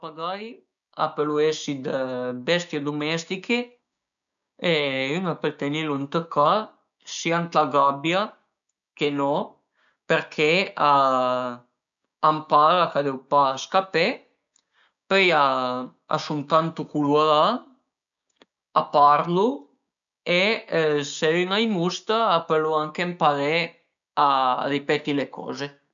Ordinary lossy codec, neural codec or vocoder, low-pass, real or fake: none; codec, 16 kHz, 6 kbps, DAC; 7.2 kHz; fake